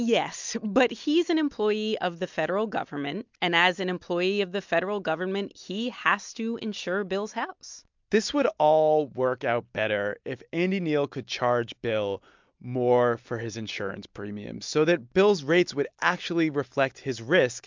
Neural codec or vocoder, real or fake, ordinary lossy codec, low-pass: none; real; MP3, 64 kbps; 7.2 kHz